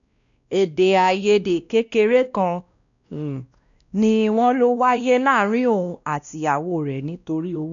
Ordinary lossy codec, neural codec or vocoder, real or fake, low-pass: none; codec, 16 kHz, 1 kbps, X-Codec, WavLM features, trained on Multilingual LibriSpeech; fake; 7.2 kHz